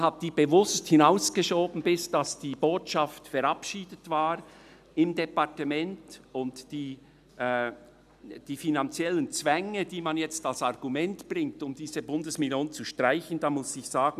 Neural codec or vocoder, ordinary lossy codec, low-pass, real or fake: none; none; 14.4 kHz; real